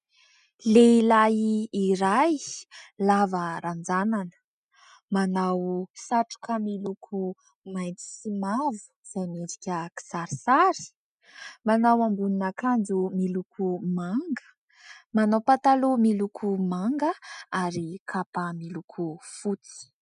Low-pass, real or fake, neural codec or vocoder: 10.8 kHz; real; none